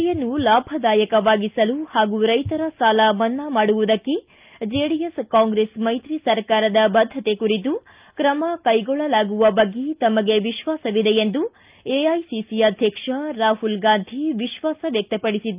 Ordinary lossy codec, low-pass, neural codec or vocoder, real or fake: Opus, 24 kbps; 3.6 kHz; none; real